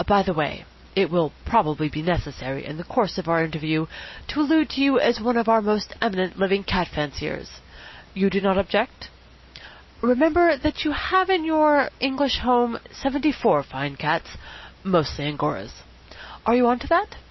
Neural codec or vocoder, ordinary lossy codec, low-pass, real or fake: none; MP3, 24 kbps; 7.2 kHz; real